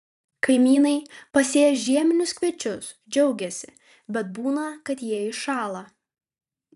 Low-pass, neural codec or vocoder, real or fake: 14.4 kHz; vocoder, 44.1 kHz, 128 mel bands every 512 samples, BigVGAN v2; fake